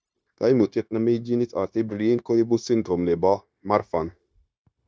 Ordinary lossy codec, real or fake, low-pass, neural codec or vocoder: none; fake; none; codec, 16 kHz, 0.9 kbps, LongCat-Audio-Codec